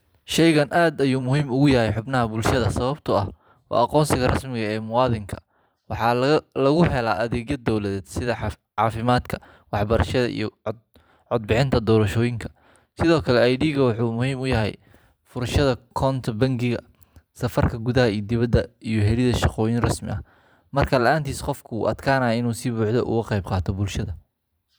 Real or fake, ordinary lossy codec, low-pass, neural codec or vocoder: fake; none; none; vocoder, 44.1 kHz, 128 mel bands every 512 samples, BigVGAN v2